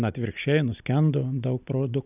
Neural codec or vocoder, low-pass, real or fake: none; 3.6 kHz; real